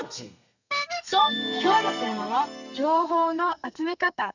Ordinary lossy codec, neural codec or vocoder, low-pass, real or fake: none; codec, 32 kHz, 1.9 kbps, SNAC; 7.2 kHz; fake